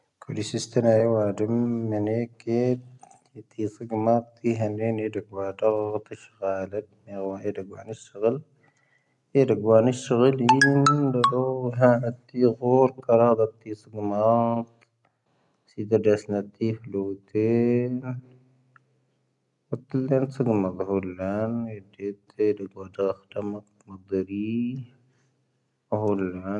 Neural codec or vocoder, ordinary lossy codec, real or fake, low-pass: none; none; real; 10.8 kHz